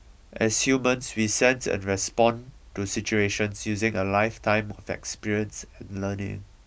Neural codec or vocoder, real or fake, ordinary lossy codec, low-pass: none; real; none; none